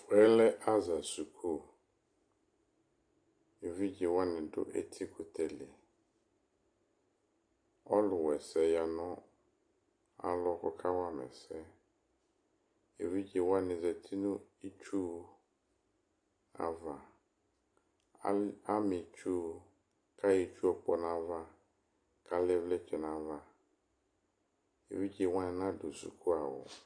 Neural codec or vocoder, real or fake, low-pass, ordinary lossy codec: none; real; 9.9 kHz; AAC, 48 kbps